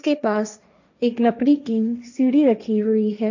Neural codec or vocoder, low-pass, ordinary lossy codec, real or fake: codec, 16 kHz, 1.1 kbps, Voila-Tokenizer; 7.2 kHz; none; fake